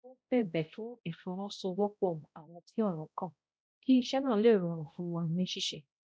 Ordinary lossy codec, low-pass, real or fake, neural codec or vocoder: none; none; fake; codec, 16 kHz, 0.5 kbps, X-Codec, HuBERT features, trained on balanced general audio